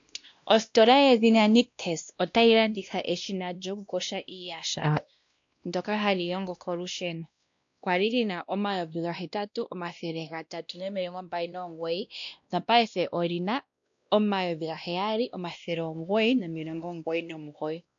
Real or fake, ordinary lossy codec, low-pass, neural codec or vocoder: fake; AAC, 64 kbps; 7.2 kHz; codec, 16 kHz, 1 kbps, X-Codec, WavLM features, trained on Multilingual LibriSpeech